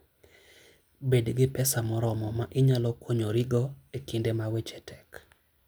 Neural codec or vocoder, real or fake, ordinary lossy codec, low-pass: none; real; none; none